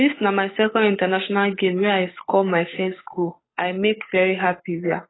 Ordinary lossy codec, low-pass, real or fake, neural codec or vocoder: AAC, 16 kbps; 7.2 kHz; fake; codec, 16 kHz, 8 kbps, FunCodec, trained on Chinese and English, 25 frames a second